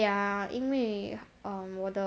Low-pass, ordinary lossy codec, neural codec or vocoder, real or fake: none; none; none; real